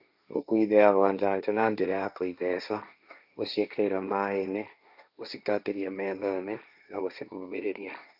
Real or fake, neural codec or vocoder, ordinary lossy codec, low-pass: fake; codec, 16 kHz, 1.1 kbps, Voila-Tokenizer; none; 5.4 kHz